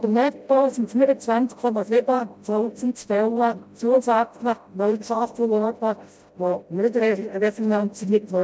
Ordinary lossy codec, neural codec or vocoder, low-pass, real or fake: none; codec, 16 kHz, 0.5 kbps, FreqCodec, smaller model; none; fake